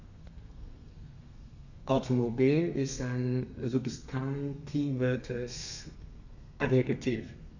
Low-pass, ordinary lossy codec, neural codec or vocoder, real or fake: 7.2 kHz; none; codec, 32 kHz, 1.9 kbps, SNAC; fake